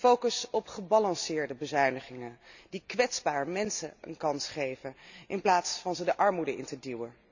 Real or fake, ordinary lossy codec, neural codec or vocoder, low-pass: real; none; none; 7.2 kHz